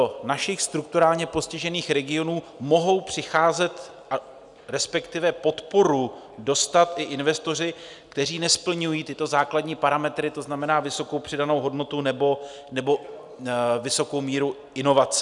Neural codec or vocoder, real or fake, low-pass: none; real; 10.8 kHz